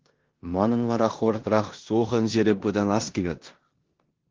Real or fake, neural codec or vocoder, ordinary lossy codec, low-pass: fake; codec, 16 kHz in and 24 kHz out, 0.9 kbps, LongCat-Audio-Codec, four codebook decoder; Opus, 16 kbps; 7.2 kHz